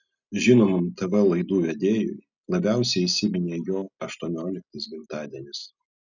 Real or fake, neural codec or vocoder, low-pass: real; none; 7.2 kHz